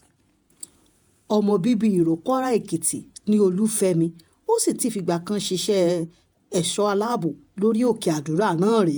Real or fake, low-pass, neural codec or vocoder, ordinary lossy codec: fake; none; vocoder, 48 kHz, 128 mel bands, Vocos; none